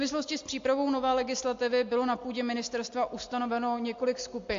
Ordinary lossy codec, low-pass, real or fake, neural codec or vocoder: AAC, 48 kbps; 7.2 kHz; real; none